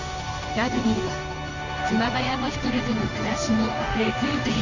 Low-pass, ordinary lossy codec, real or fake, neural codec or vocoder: 7.2 kHz; none; fake; codec, 16 kHz in and 24 kHz out, 1 kbps, XY-Tokenizer